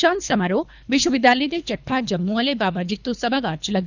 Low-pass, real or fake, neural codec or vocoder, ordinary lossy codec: 7.2 kHz; fake; codec, 24 kHz, 3 kbps, HILCodec; none